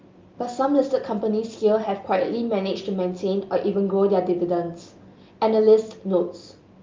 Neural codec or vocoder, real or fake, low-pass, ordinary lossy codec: none; real; 7.2 kHz; Opus, 24 kbps